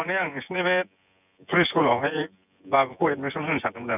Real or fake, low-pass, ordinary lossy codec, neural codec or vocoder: fake; 3.6 kHz; none; vocoder, 24 kHz, 100 mel bands, Vocos